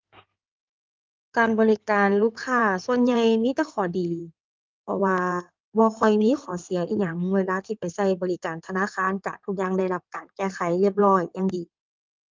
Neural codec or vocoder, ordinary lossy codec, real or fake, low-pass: codec, 16 kHz in and 24 kHz out, 2.2 kbps, FireRedTTS-2 codec; Opus, 24 kbps; fake; 7.2 kHz